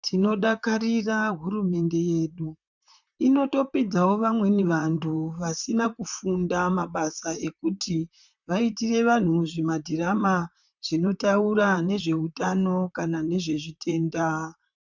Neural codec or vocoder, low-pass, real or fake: vocoder, 44.1 kHz, 128 mel bands, Pupu-Vocoder; 7.2 kHz; fake